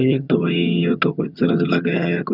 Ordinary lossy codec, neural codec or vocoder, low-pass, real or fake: none; vocoder, 22.05 kHz, 80 mel bands, HiFi-GAN; 5.4 kHz; fake